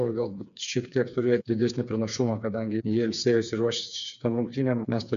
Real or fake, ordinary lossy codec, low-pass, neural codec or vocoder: fake; MP3, 96 kbps; 7.2 kHz; codec, 16 kHz, 4 kbps, FreqCodec, smaller model